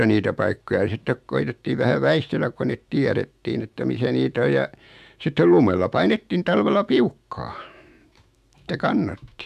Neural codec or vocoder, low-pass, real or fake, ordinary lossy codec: autoencoder, 48 kHz, 128 numbers a frame, DAC-VAE, trained on Japanese speech; 14.4 kHz; fake; MP3, 96 kbps